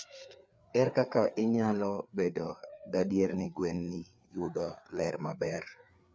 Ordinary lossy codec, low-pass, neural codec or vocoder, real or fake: none; none; codec, 16 kHz, 4 kbps, FreqCodec, larger model; fake